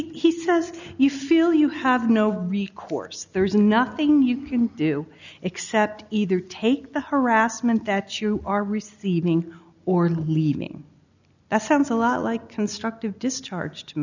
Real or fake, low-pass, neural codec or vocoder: real; 7.2 kHz; none